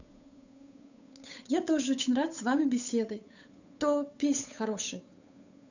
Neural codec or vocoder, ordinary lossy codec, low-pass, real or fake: codec, 16 kHz, 8 kbps, FunCodec, trained on Chinese and English, 25 frames a second; none; 7.2 kHz; fake